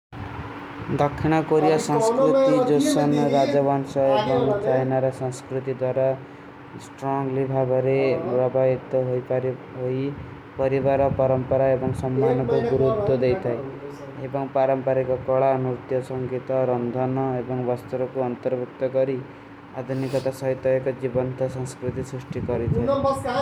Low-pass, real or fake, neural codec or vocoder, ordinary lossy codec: 19.8 kHz; real; none; none